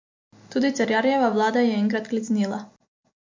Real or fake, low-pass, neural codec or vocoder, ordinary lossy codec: real; 7.2 kHz; none; MP3, 48 kbps